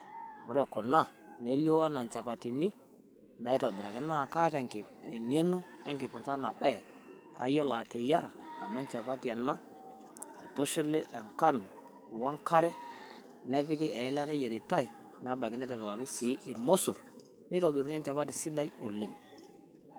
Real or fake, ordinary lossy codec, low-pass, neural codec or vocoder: fake; none; none; codec, 44.1 kHz, 2.6 kbps, SNAC